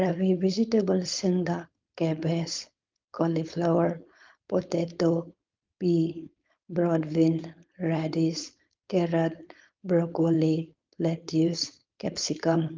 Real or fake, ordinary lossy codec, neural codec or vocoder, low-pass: fake; Opus, 24 kbps; codec, 16 kHz, 4.8 kbps, FACodec; 7.2 kHz